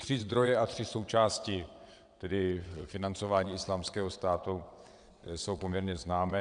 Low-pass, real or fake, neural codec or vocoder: 9.9 kHz; fake; vocoder, 22.05 kHz, 80 mel bands, WaveNeXt